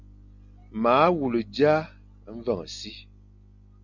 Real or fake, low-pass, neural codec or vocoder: real; 7.2 kHz; none